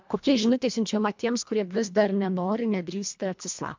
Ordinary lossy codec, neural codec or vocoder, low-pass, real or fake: MP3, 64 kbps; codec, 24 kHz, 1.5 kbps, HILCodec; 7.2 kHz; fake